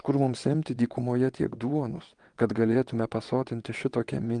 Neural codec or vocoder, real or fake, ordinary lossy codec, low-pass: vocoder, 22.05 kHz, 80 mel bands, WaveNeXt; fake; Opus, 24 kbps; 9.9 kHz